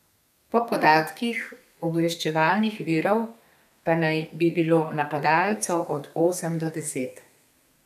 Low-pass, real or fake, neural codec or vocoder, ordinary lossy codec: 14.4 kHz; fake; codec, 32 kHz, 1.9 kbps, SNAC; none